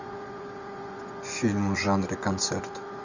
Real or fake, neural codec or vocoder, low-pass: fake; vocoder, 24 kHz, 100 mel bands, Vocos; 7.2 kHz